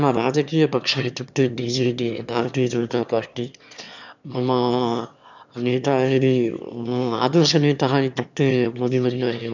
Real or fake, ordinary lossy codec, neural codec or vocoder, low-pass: fake; none; autoencoder, 22.05 kHz, a latent of 192 numbers a frame, VITS, trained on one speaker; 7.2 kHz